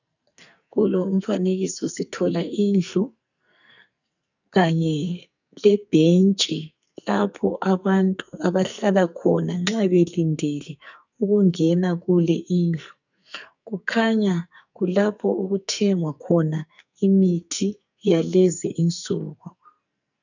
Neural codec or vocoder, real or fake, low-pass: codec, 44.1 kHz, 2.6 kbps, SNAC; fake; 7.2 kHz